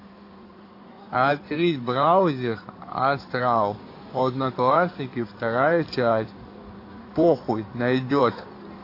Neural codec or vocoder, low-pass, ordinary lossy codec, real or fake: codec, 16 kHz in and 24 kHz out, 2.2 kbps, FireRedTTS-2 codec; 5.4 kHz; AAC, 32 kbps; fake